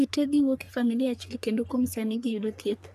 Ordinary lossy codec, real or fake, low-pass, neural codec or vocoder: none; fake; 14.4 kHz; codec, 44.1 kHz, 3.4 kbps, Pupu-Codec